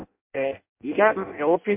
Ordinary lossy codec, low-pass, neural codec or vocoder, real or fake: MP3, 32 kbps; 3.6 kHz; codec, 16 kHz in and 24 kHz out, 0.6 kbps, FireRedTTS-2 codec; fake